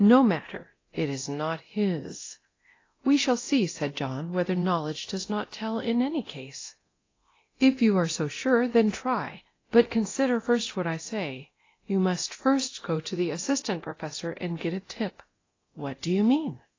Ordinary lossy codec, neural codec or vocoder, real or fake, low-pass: AAC, 32 kbps; codec, 24 kHz, 0.9 kbps, DualCodec; fake; 7.2 kHz